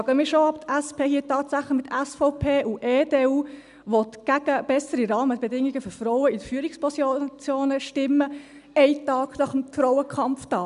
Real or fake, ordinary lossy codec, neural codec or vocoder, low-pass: real; none; none; 10.8 kHz